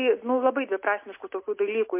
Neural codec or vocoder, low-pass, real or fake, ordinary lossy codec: none; 3.6 kHz; real; MP3, 24 kbps